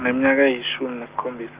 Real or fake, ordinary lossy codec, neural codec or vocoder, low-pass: real; Opus, 32 kbps; none; 3.6 kHz